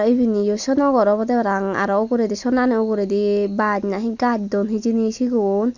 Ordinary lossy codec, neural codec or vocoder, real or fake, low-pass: none; none; real; 7.2 kHz